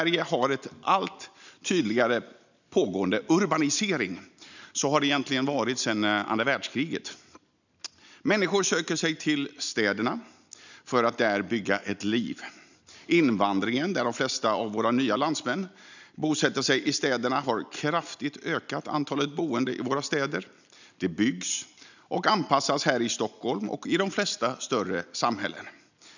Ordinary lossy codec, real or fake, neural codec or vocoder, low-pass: none; real; none; 7.2 kHz